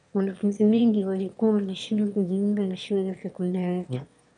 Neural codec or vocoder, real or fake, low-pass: autoencoder, 22.05 kHz, a latent of 192 numbers a frame, VITS, trained on one speaker; fake; 9.9 kHz